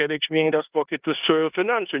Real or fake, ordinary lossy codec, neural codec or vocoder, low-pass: fake; Opus, 32 kbps; codec, 16 kHz in and 24 kHz out, 0.9 kbps, LongCat-Audio-Codec, four codebook decoder; 3.6 kHz